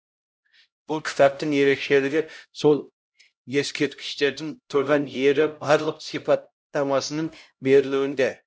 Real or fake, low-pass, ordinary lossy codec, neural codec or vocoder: fake; none; none; codec, 16 kHz, 0.5 kbps, X-Codec, HuBERT features, trained on LibriSpeech